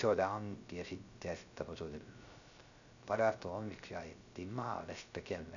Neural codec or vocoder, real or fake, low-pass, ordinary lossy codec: codec, 16 kHz, 0.3 kbps, FocalCodec; fake; 7.2 kHz; none